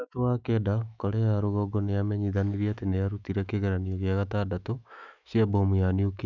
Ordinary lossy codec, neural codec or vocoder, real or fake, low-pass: none; autoencoder, 48 kHz, 128 numbers a frame, DAC-VAE, trained on Japanese speech; fake; 7.2 kHz